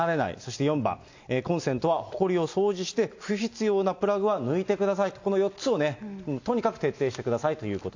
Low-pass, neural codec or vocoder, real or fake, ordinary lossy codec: 7.2 kHz; none; real; AAC, 48 kbps